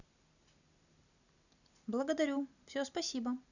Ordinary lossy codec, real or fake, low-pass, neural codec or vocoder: none; real; 7.2 kHz; none